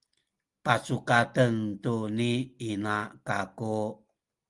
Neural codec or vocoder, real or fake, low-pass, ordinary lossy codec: none; real; 10.8 kHz; Opus, 24 kbps